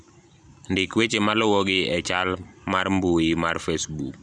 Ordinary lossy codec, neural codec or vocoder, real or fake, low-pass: none; vocoder, 44.1 kHz, 128 mel bands every 256 samples, BigVGAN v2; fake; 9.9 kHz